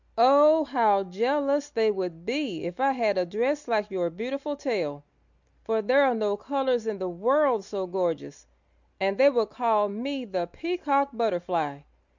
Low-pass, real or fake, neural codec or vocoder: 7.2 kHz; real; none